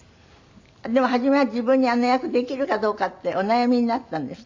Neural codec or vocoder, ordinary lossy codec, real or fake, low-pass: none; MP3, 64 kbps; real; 7.2 kHz